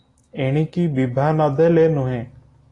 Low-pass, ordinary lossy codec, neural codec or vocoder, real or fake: 10.8 kHz; AAC, 32 kbps; none; real